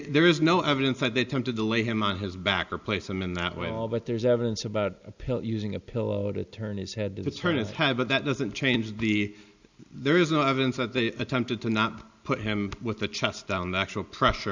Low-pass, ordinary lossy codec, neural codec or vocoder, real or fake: 7.2 kHz; Opus, 64 kbps; none; real